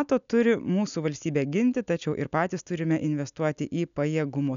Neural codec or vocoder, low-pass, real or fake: none; 7.2 kHz; real